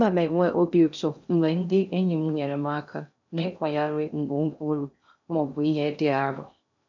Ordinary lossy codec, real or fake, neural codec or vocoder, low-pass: none; fake; codec, 16 kHz in and 24 kHz out, 0.6 kbps, FocalCodec, streaming, 2048 codes; 7.2 kHz